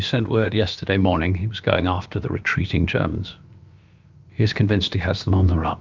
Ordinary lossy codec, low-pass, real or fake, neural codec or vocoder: Opus, 24 kbps; 7.2 kHz; fake; codec, 16 kHz, about 1 kbps, DyCAST, with the encoder's durations